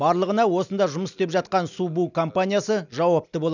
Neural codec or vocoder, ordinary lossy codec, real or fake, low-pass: none; none; real; 7.2 kHz